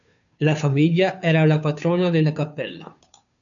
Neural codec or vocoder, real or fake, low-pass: codec, 16 kHz, 2 kbps, FunCodec, trained on Chinese and English, 25 frames a second; fake; 7.2 kHz